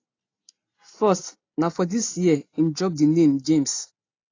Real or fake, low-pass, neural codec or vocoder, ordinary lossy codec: real; 7.2 kHz; none; AAC, 32 kbps